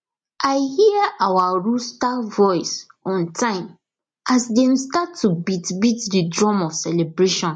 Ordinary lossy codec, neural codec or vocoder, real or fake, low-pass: MP3, 48 kbps; none; real; 9.9 kHz